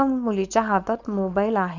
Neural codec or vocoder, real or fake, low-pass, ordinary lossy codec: codec, 16 kHz, 4.8 kbps, FACodec; fake; 7.2 kHz; none